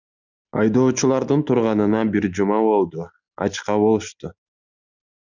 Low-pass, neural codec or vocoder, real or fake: 7.2 kHz; none; real